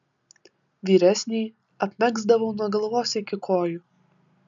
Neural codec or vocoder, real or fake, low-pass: none; real; 7.2 kHz